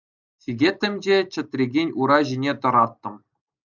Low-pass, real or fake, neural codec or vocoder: 7.2 kHz; real; none